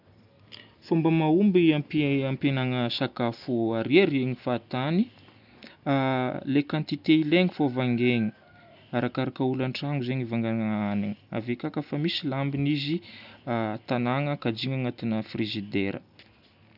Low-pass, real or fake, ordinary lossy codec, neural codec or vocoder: 5.4 kHz; real; none; none